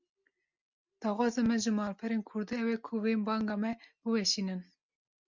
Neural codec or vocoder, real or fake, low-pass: none; real; 7.2 kHz